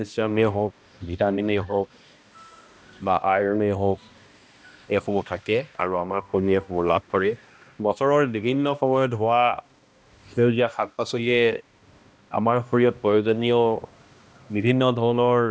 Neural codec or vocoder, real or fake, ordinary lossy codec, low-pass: codec, 16 kHz, 1 kbps, X-Codec, HuBERT features, trained on balanced general audio; fake; none; none